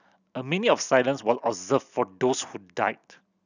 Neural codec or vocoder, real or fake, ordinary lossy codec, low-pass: none; real; none; 7.2 kHz